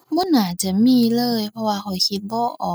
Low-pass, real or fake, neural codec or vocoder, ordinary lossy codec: none; real; none; none